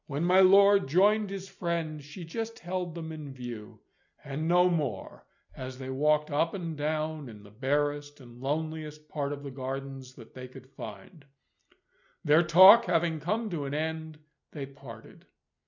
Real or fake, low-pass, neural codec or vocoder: real; 7.2 kHz; none